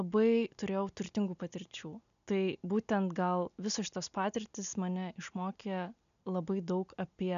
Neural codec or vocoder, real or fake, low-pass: none; real; 7.2 kHz